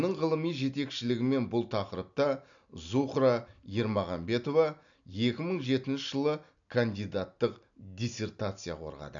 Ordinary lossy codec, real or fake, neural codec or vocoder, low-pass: none; real; none; 7.2 kHz